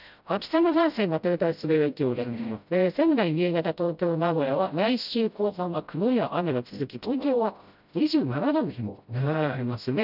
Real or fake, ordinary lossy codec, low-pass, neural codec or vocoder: fake; none; 5.4 kHz; codec, 16 kHz, 0.5 kbps, FreqCodec, smaller model